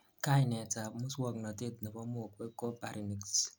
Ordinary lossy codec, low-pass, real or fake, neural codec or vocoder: none; none; real; none